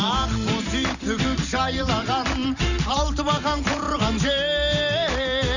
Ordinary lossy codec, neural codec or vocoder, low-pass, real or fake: none; vocoder, 44.1 kHz, 128 mel bands every 512 samples, BigVGAN v2; 7.2 kHz; fake